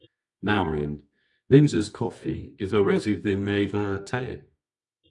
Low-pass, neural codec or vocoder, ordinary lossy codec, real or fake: 10.8 kHz; codec, 24 kHz, 0.9 kbps, WavTokenizer, medium music audio release; AAC, 64 kbps; fake